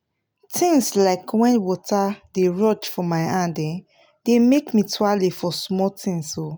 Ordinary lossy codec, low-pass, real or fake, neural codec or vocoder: none; none; real; none